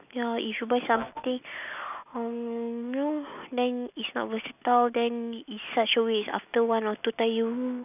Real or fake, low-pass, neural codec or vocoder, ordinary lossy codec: real; 3.6 kHz; none; none